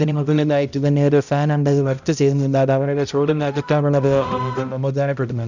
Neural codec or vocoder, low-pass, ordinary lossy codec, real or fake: codec, 16 kHz, 0.5 kbps, X-Codec, HuBERT features, trained on balanced general audio; 7.2 kHz; none; fake